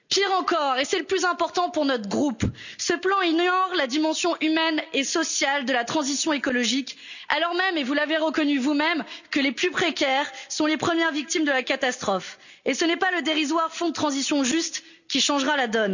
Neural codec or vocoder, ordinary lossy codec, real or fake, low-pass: none; none; real; 7.2 kHz